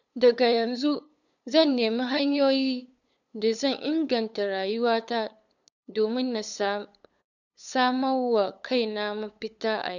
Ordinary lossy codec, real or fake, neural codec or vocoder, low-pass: none; fake; codec, 16 kHz, 8 kbps, FunCodec, trained on LibriTTS, 25 frames a second; 7.2 kHz